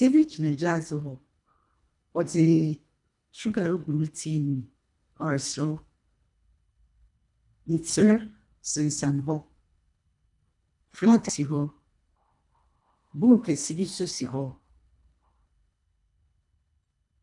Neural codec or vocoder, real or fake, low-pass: codec, 24 kHz, 1.5 kbps, HILCodec; fake; 10.8 kHz